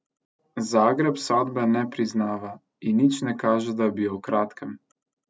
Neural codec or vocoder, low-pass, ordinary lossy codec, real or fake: none; none; none; real